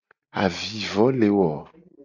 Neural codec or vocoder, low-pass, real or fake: none; 7.2 kHz; real